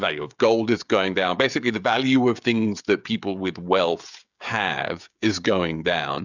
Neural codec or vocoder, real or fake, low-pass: codec, 16 kHz, 16 kbps, FreqCodec, smaller model; fake; 7.2 kHz